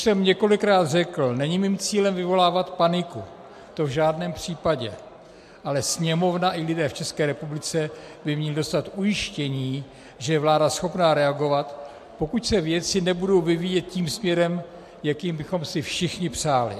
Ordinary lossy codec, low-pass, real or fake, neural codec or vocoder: MP3, 64 kbps; 14.4 kHz; real; none